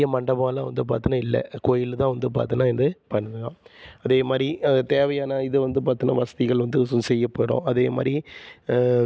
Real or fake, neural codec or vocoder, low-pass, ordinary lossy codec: real; none; none; none